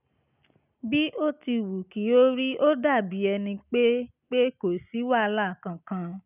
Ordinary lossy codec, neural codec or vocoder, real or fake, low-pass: none; none; real; 3.6 kHz